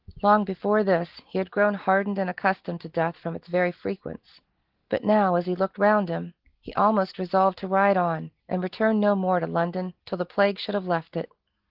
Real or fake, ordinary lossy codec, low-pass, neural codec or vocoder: real; Opus, 16 kbps; 5.4 kHz; none